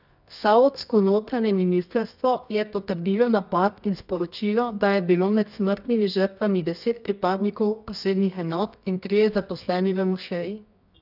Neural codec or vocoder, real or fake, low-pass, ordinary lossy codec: codec, 24 kHz, 0.9 kbps, WavTokenizer, medium music audio release; fake; 5.4 kHz; none